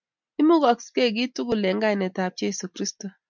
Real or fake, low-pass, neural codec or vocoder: real; 7.2 kHz; none